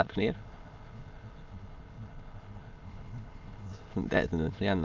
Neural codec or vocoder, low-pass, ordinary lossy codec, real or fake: autoencoder, 22.05 kHz, a latent of 192 numbers a frame, VITS, trained on many speakers; 7.2 kHz; Opus, 24 kbps; fake